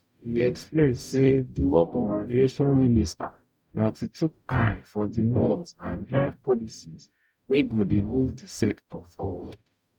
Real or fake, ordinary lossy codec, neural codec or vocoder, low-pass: fake; none; codec, 44.1 kHz, 0.9 kbps, DAC; 19.8 kHz